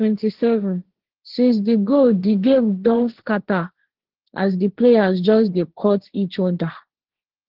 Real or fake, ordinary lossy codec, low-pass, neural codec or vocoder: fake; Opus, 16 kbps; 5.4 kHz; codec, 16 kHz, 1.1 kbps, Voila-Tokenizer